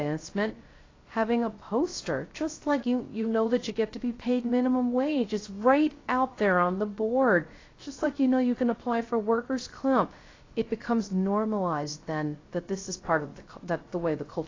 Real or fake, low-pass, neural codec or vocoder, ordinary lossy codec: fake; 7.2 kHz; codec, 16 kHz, 0.3 kbps, FocalCodec; AAC, 32 kbps